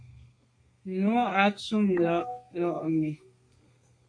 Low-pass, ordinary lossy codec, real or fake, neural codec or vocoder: 9.9 kHz; MP3, 48 kbps; fake; codec, 32 kHz, 1.9 kbps, SNAC